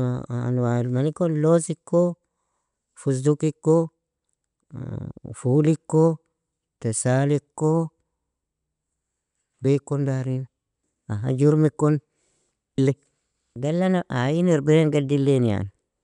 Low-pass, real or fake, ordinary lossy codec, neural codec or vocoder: 10.8 kHz; real; none; none